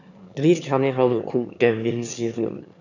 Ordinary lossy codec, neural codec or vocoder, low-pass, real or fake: none; autoencoder, 22.05 kHz, a latent of 192 numbers a frame, VITS, trained on one speaker; 7.2 kHz; fake